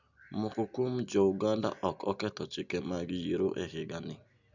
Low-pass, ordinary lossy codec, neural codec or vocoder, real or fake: 7.2 kHz; none; none; real